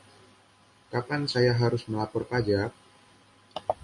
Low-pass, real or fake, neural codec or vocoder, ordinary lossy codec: 10.8 kHz; real; none; MP3, 48 kbps